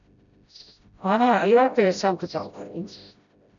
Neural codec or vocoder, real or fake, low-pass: codec, 16 kHz, 0.5 kbps, FreqCodec, smaller model; fake; 7.2 kHz